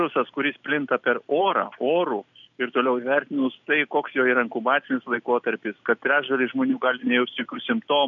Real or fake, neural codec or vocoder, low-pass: real; none; 7.2 kHz